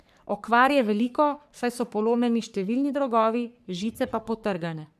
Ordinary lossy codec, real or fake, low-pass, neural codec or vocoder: none; fake; 14.4 kHz; codec, 44.1 kHz, 3.4 kbps, Pupu-Codec